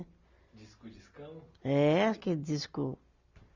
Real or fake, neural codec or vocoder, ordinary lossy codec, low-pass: real; none; Opus, 64 kbps; 7.2 kHz